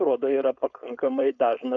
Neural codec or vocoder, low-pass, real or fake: codec, 16 kHz, 4.8 kbps, FACodec; 7.2 kHz; fake